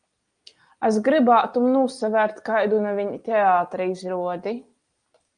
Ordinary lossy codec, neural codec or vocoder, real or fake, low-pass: Opus, 32 kbps; none; real; 9.9 kHz